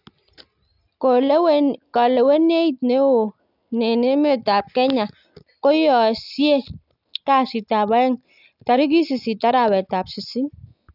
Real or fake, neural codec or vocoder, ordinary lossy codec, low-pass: real; none; none; 5.4 kHz